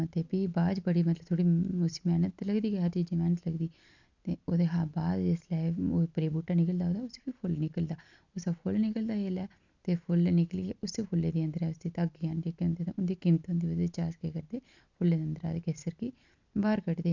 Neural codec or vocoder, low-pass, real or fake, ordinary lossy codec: none; 7.2 kHz; real; none